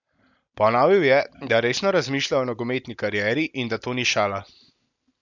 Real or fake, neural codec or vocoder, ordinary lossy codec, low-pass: real; none; none; 7.2 kHz